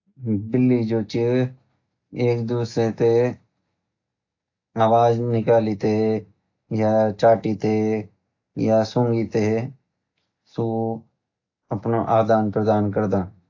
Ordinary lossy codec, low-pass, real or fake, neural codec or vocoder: AAC, 48 kbps; 7.2 kHz; real; none